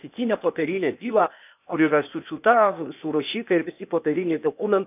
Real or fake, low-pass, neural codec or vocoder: fake; 3.6 kHz; codec, 16 kHz, 0.8 kbps, ZipCodec